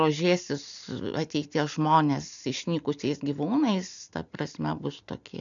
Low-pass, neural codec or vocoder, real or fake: 7.2 kHz; none; real